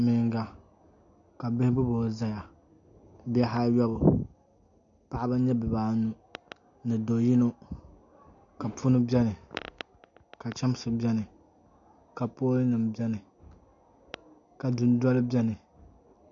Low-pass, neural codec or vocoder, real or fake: 7.2 kHz; none; real